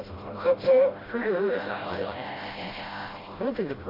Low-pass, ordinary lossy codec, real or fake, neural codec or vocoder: 5.4 kHz; AAC, 24 kbps; fake; codec, 16 kHz, 0.5 kbps, FreqCodec, smaller model